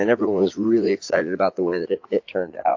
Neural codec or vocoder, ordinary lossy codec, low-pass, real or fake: vocoder, 44.1 kHz, 80 mel bands, Vocos; AAC, 48 kbps; 7.2 kHz; fake